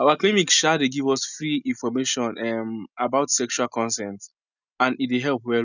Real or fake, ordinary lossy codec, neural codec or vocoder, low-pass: real; none; none; 7.2 kHz